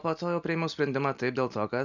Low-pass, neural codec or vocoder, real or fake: 7.2 kHz; none; real